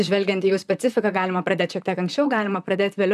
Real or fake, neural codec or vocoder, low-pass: fake; vocoder, 44.1 kHz, 128 mel bands, Pupu-Vocoder; 14.4 kHz